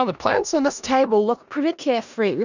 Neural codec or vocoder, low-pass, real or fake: codec, 16 kHz in and 24 kHz out, 0.4 kbps, LongCat-Audio-Codec, four codebook decoder; 7.2 kHz; fake